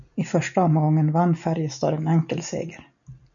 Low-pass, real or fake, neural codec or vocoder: 7.2 kHz; real; none